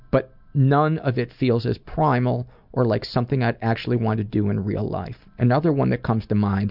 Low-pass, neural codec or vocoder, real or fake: 5.4 kHz; none; real